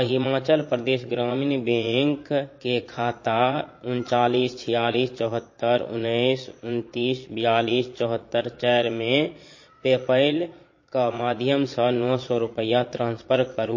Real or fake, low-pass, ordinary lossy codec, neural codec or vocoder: fake; 7.2 kHz; MP3, 32 kbps; vocoder, 22.05 kHz, 80 mel bands, Vocos